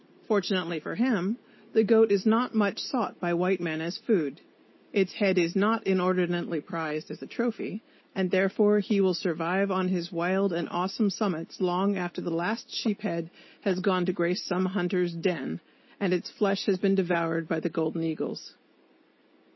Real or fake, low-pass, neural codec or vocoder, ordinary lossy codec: real; 7.2 kHz; none; MP3, 24 kbps